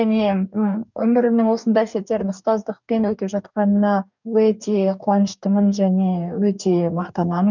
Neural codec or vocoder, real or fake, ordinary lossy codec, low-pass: codec, 44.1 kHz, 2.6 kbps, DAC; fake; none; 7.2 kHz